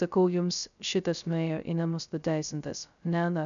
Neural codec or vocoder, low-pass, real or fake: codec, 16 kHz, 0.2 kbps, FocalCodec; 7.2 kHz; fake